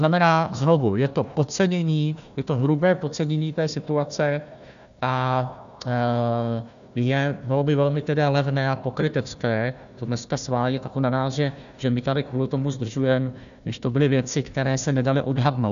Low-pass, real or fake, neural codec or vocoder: 7.2 kHz; fake; codec, 16 kHz, 1 kbps, FunCodec, trained on Chinese and English, 50 frames a second